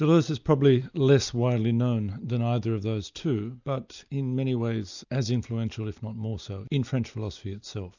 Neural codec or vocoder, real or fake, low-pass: none; real; 7.2 kHz